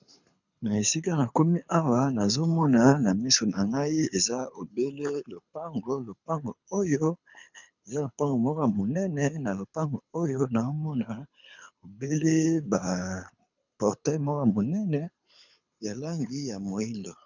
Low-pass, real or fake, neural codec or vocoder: 7.2 kHz; fake; codec, 24 kHz, 6 kbps, HILCodec